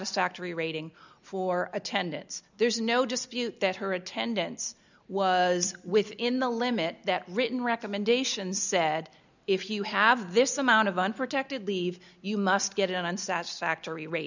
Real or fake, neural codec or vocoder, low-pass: real; none; 7.2 kHz